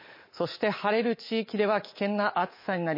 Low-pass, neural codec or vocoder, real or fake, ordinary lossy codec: 5.4 kHz; codec, 24 kHz, 3.1 kbps, DualCodec; fake; MP3, 24 kbps